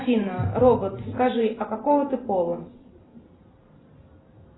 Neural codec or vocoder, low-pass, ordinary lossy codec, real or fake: vocoder, 44.1 kHz, 128 mel bands every 512 samples, BigVGAN v2; 7.2 kHz; AAC, 16 kbps; fake